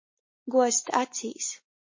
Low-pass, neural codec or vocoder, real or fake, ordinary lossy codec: 7.2 kHz; none; real; MP3, 32 kbps